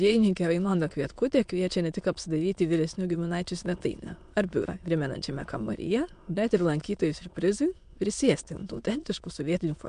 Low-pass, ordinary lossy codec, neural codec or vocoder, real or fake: 9.9 kHz; MP3, 64 kbps; autoencoder, 22.05 kHz, a latent of 192 numbers a frame, VITS, trained on many speakers; fake